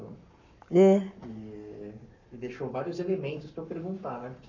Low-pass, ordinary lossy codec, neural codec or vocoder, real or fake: 7.2 kHz; none; codec, 44.1 kHz, 7.8 kbps, Pupu-Codec; fake